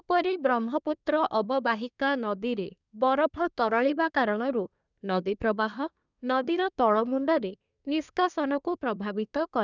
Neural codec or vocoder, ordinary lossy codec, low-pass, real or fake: codec, 24 kHz, 1 kbps, SNAC; none; 7.2 kHz; fake